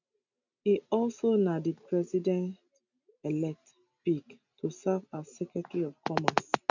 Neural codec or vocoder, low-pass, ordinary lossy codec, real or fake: none; 7.2 kHz; none; real